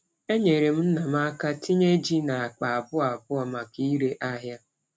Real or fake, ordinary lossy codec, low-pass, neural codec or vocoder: real; none; none; none